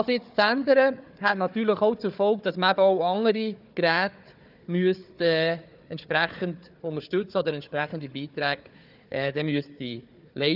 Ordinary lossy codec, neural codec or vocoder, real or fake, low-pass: none; codec, 16 kHz, 4 kbps, FunCodec, trained on Chinese and English, 50 frames a second; fake; 5.4 kHz